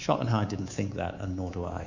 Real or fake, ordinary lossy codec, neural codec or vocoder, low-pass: fake; Opus, 64 kbps; codec, 24 kHz, 3.1 kbps, DualCodec; 7.2 kHz